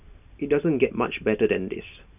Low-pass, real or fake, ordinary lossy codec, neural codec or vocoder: 3.6 kHz; real; none; none